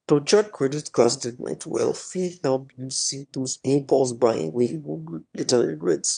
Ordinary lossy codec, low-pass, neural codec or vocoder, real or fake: none; 9.9 kHz; autoencoder, 22.05 kHz, a latent of 192 numbers a frame, VITS, trained on one speaker; fake